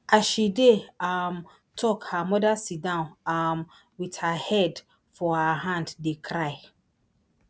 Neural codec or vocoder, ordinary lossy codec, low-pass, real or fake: none; none; none; real